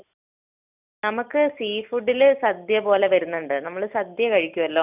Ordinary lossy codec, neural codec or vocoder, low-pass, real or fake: none; none; 3.6 kHz; real